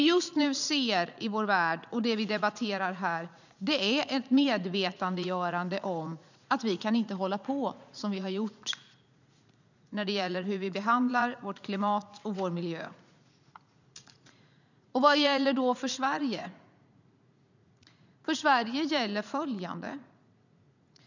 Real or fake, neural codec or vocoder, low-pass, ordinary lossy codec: fake; vocoder, 44.1 kHz, 80 mel bands, Vocos; 7.2 kHz; none